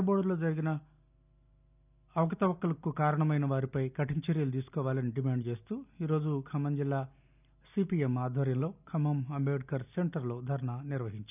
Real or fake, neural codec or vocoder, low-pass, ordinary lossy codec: real; none; 3.6 kHz; none